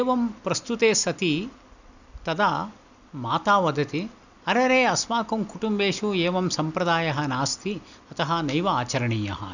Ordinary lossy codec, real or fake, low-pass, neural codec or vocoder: none; real; 7.2 kHz; none